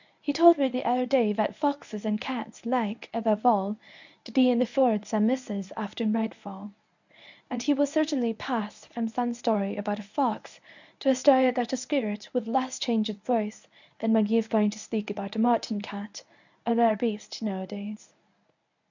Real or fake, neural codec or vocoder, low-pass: fake; codec, 24 kHz, 0.9 kbps, WavTokenizer, medium speech release version 1; 7.2 kHz